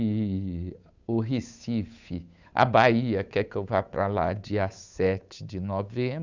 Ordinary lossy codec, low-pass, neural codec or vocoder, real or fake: Opus, 64 kbps; 7.2 kHz; codec, 16 kHz, 8 kbps, FunCodec, trained on Chinese and English, 25 frames a second; fake